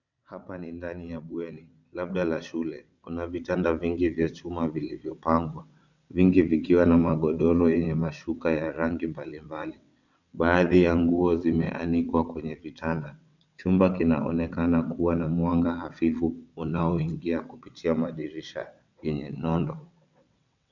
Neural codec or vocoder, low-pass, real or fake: vocoder, 22.05 kHz, 80 mel bands, WaveNeXt; 7.2 kHz; fake